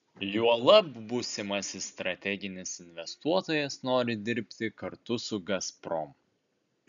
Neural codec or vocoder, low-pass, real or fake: none; 7.2 kHz; real